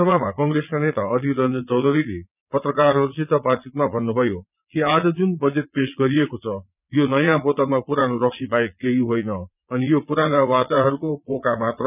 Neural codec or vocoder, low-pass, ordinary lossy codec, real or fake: vocoder, 22.05 kHz, 80 mel bands, Vocos; 3.6 kHz; none; fake